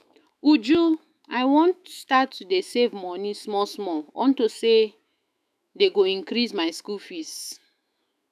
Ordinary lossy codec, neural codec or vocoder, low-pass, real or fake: none; autoencoder, 48 kHz, 128 numbers a frame, DAC-VAE, trained on Japanese speech; 14.4 kHz; fake